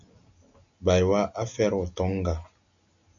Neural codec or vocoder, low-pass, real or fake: none; 7.2 kHz; real